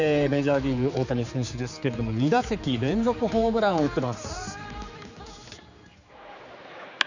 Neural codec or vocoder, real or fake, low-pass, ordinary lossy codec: codec, 16 kHz, 4 kbps, X-Codec, HuBERT features, trained on general audio; fake; 7.2 kHz; none